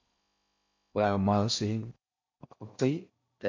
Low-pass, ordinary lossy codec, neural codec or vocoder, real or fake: 7.2 kHz; MP3, 48 kbps; codec, 16 kHz in and 24 kHz out, 0.6 kbps, FocalCodec, streaming, 4096 codes; fake